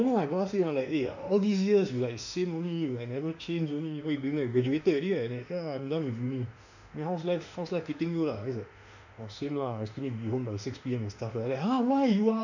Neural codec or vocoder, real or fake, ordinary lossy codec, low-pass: autoencoder, 48 kHz, 32 numbers a frame, DAC-VAE, trained on Japanese speech; fake; none; 7.2 kHz